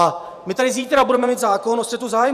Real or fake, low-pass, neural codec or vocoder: fake; 14.4 kHz; vocoder, 48 kHz, 128 mel bands, Vocos